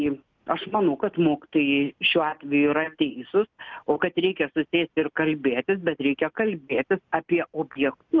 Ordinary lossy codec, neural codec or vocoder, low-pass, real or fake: Opus, 24 kbps; none; 7.2 kHz; real